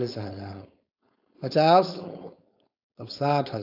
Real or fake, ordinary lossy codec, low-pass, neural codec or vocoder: fake; AAC, 48 kbps; 5.4 kHz; codec, 16 kHz, 4.8 kbps, FACodec